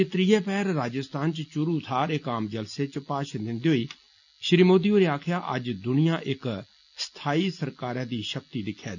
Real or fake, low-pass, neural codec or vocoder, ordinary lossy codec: real; 7.2 kHz; none; none